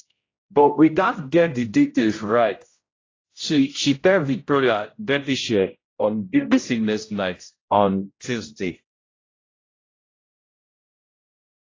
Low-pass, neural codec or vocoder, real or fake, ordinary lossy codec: 7.2 kHz; codec, 16 kHz, 0.5 kbps, X-Codec, HuBERT features, trained on general audio; fake; AAC, 32 kbps